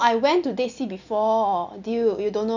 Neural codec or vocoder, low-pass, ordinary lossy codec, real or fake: none; 7.2 kHz; none; real